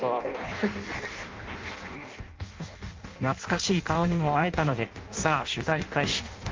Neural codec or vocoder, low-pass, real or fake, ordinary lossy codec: codec, 16 kHz in and 24 kHz out, 0.6 kbps, FireRedTTS-2 codec; 7.2 kHz; fake; Opus, 24 kbps